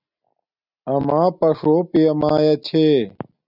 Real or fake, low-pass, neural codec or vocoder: real; 5.4 kHz; none